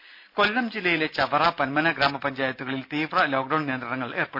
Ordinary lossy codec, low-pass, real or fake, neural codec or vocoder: MP3, 48 kbps; 5.4 kHz; real; none